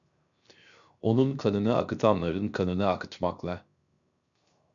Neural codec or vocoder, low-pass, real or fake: codec, 16 kHz, 0.7 kbps, FocalCodec; 7.2 kHz; fake